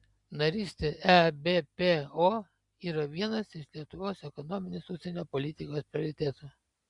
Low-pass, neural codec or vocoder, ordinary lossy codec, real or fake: 10.8 kHz; none; Opus, 64 kbps; real